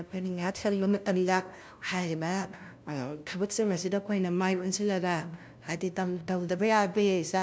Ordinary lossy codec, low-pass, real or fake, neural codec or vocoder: none; none; fake; codec, 16 kHz, 0.5 kbps, FunCodec, trained on LibriTTS, 25 frames a second